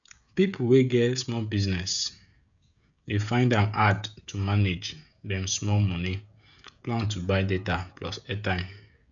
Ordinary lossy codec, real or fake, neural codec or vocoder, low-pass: none; fake; codec, 16 kHz, 16 kbps, FreqCodec, smaller model; 7.2 kHz